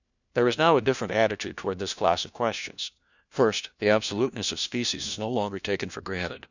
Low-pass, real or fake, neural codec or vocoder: 7.2 kHz; fake; codec, 16 kHz, 1 kbps, FunCodec, trained on LibriTTS, 50 frames a second